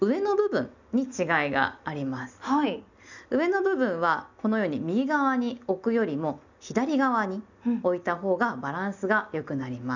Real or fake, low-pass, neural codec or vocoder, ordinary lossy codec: real; 7.2 kHz; none; none